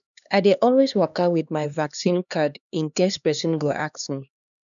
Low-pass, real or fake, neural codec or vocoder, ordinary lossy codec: 7.2 kHz; fake; codec, 16 kHz, 2 kbps, X-Codec, HuBERT features, trained on LibriSpeech; none